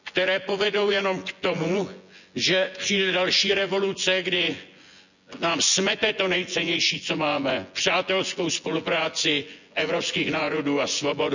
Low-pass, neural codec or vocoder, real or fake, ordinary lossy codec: 7.2 kHz; vocoder, 24 kHz, 100 mel bands, Vocos; fake; none